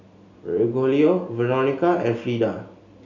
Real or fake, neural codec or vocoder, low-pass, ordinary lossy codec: real; none; 7.2 kHz; none